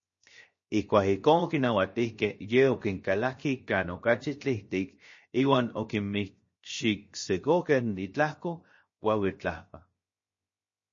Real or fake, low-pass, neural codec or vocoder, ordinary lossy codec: fake; 7.2 kHz; codec, 16 kHz, 0.7 kbps, FocalCodec; MP3, 32 kbps